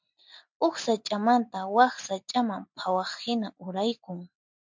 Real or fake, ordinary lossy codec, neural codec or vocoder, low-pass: real; MP3, 64 kbps; none; 7.2 kHz